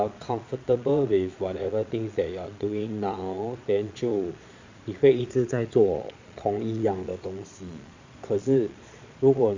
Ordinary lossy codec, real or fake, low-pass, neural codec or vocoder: MP3, 48 kbps; fake; 7.2 kHz; vocoder, 22.05 kHz, 80 mel bands, Vocos